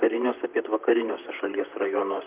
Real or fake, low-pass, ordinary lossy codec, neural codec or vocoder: fake; 3.6 kHz; Opus, 24 kbps; vocoder, 44.1 kHz, 128 mel bands, Pupu-Vocoder